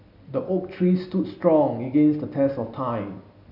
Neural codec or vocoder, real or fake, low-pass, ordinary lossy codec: none; real; 5.4 kHz; none